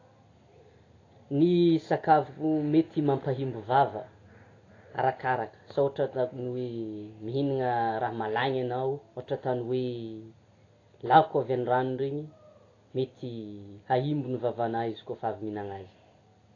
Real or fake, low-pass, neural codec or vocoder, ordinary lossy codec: real; 7.2 kHz; none; AAC, 32 kbps